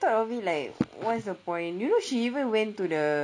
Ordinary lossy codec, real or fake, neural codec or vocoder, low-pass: none; real; none; 9.9 kHz